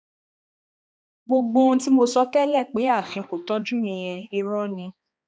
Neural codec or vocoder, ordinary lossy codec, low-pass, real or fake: codec, 16 kHz, 2 kbps, X-Codec, HuBERT features, trained on balanced general audio; none; none; fake